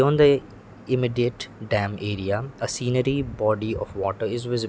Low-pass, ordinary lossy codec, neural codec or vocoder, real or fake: none; none; none; real